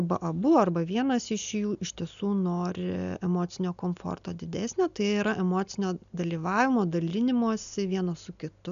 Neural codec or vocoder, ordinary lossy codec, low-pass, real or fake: none; MP3, 96 kbps; 7.2 kHz; real